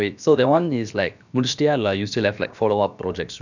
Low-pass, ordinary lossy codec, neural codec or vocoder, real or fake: 7.2 kHz; none; codec, 16 kHz, about 1 kbps, DyCAST, with the encoder's durations; fake